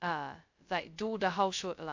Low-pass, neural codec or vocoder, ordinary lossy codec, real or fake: 7.2 kHz; codec, 16 kHz, 0.2 kbps, FocalCodec; AAC, 48 kbps; fake